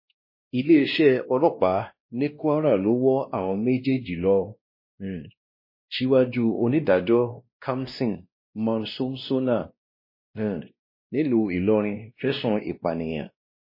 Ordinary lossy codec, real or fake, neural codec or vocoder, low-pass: MP3, 24 kbps; fake; codec, 16 kHz, 1 kbps, X-Codec, WavLM features, trained on Multilingual LibriSpeech; 5.4 kHz